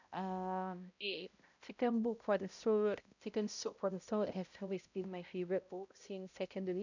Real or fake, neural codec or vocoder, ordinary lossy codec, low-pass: fake; codec, 16 kHz, 0.5 kbps, X-Codec, HuBERT features, trained on balanced general audio; none; 7.2 kHz